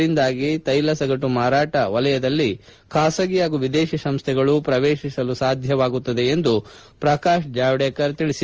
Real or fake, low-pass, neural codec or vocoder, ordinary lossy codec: real; 7.2 kHz; none; Opus, 24 kbps